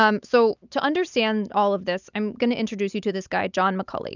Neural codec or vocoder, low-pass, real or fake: none; 7.2 kHz; real